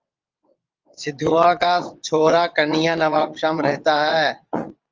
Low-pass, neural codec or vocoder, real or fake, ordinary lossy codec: 7.2 kHz; vocoder, 22.05 kHz, 80 mel bands, WaveNeXt; fake; Opus, 24 kbps